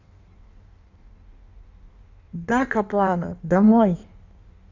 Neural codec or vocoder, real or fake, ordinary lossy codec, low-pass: codec, 16 kHz in and 24 kHz out, 1.1 kbps, FireRedTTS-2 codec; fake; none; 7.2 kHz